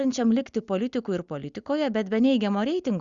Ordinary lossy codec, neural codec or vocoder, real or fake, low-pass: Opus, 64 kbps; none; real; 7.2 kHz